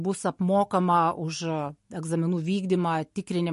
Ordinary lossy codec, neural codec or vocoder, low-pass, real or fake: MP3, 48 kbps; none; 14.4 kHz; real